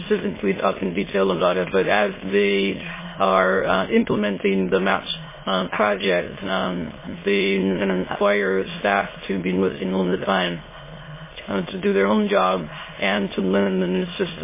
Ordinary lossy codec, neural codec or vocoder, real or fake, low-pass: MP3, 16 kbps; autoencoder, 22.05 kHz, a latent of 192 numbers a frame, VITS, trained on many speakers; fake; 3.6 kHz